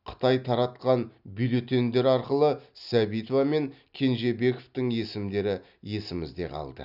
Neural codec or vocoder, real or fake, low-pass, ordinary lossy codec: none; real; 5.4 kHz; none